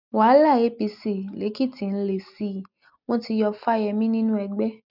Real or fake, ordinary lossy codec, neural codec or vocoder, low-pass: real; none; none; 5.4 kHz